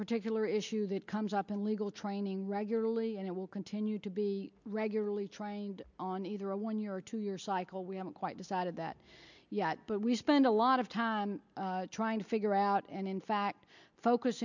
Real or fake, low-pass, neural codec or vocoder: real; 7.2 kHz; none